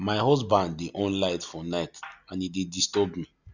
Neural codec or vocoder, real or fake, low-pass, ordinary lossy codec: none; real; 7.2 kHz; none